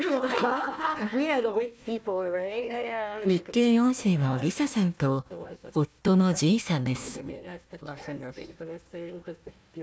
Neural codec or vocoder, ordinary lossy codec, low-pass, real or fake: codec, 16 kHz, 1 kbps, FunCodec, trained on Chinese and English, 50 frames a second; none; none; fake